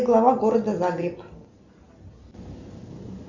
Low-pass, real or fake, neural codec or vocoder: 7.2 kHz; real; none